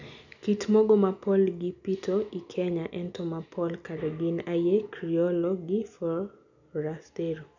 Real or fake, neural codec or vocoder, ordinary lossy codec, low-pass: real; none; none; 7.2 kHz